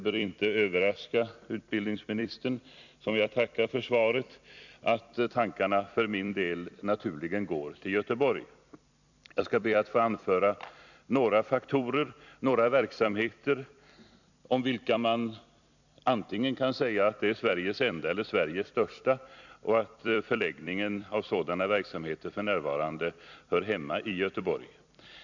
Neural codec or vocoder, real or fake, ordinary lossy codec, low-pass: vocoder, 44.1 kHz, 128 mel bands every 256 samples, BigVGAN v2; fake; none; 7.2 kHz